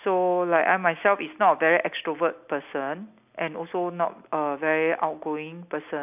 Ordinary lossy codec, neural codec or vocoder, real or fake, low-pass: none; none; real; 3.6 kHz